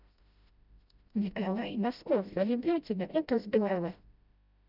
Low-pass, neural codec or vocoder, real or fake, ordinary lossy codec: 5.4 kHz; codec, 16 kHz, 0.5 kbps, FreqCodec, smaller model; fake; none